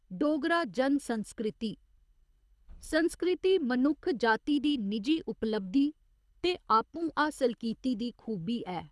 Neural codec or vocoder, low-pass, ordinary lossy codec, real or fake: codec, 24 kHz, 6 kbps, HILCodec; none; none; fake